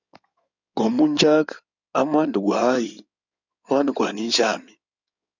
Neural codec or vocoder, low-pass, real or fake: codec, 16 kHz in and 24 kHz out, 2.2 kbps, FireRedTTS-2 codec; 7.2 kHz; fake